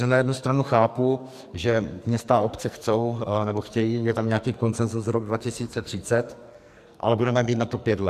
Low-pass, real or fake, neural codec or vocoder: 14.4 kHz; fake; codec, 44.1 kHz, 2.6 kbps, SNAC